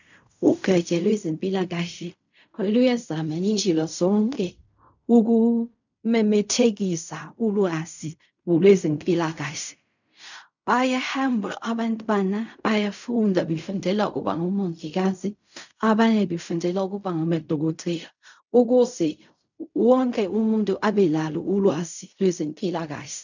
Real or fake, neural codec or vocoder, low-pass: fake; codec, 16 kHz in and 24 kHz out, 0.4 kbps, LongCat-Audio-Codec, fine tuned four codebook decoder; 7.2 kHz